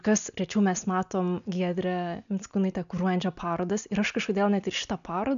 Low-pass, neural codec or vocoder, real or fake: 7.2 kHz; none; real